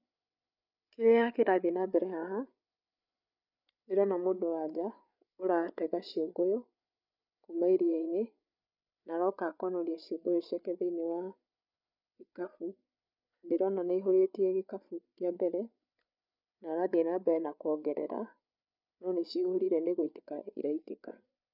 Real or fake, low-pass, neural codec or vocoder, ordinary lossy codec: fake; 5.4 kHz; codec, 16 kHz, 8 kbps, FreqCodec, larger model; none